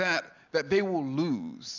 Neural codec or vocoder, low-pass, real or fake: none; 7.2 kHz; real